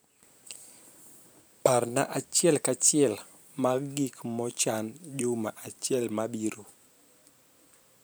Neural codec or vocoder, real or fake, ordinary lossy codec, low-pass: vocoder, 44.1 kHz, 128 mel bands every 512 samples, BigVGAN v2; fake; none; none